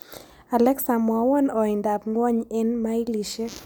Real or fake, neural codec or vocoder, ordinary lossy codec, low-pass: real; none; none; none